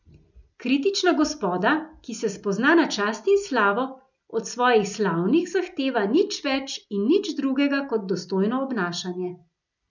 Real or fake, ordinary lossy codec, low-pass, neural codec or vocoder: real; none; 7.2 kHz; none